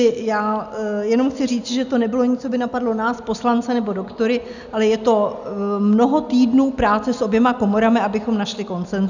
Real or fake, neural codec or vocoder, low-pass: real; none; 7.2 kHz